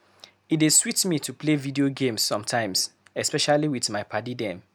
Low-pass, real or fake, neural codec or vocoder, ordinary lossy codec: none; real; none; none